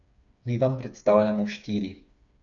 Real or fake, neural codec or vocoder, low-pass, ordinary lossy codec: fake; codec, 16 kHz, 4 kbps, FreqCodec, smaller model; 7.2 kHz; none